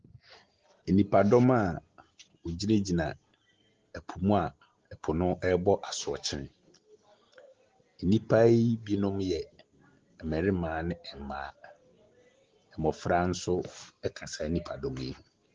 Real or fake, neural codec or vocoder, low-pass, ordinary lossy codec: real; none; 7.2 kHz; Opus, 16 kbps